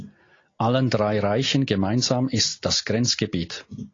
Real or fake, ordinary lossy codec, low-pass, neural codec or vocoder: real; AAC, 32 kbps; 7.2 kHz; none